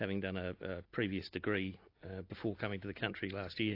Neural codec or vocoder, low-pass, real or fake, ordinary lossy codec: none; 5.4 kHz; real; AAC, 32 kbps